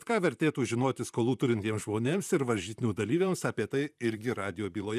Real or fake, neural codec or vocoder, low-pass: fake; vocoder, 44.1 kHz, 128 mel bands, Pupu-Vocoder; 14.4 kHz